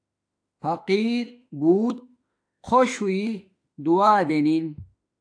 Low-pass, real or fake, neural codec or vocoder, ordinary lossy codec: 9.9 kHz; fake; autoencoder, 48 kHz, 32 numbers a frame, DAC-VAE, trained on Japanese speech; AAC, 64 kbps